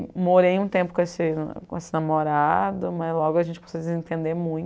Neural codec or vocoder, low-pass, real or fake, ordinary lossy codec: none; none; real; none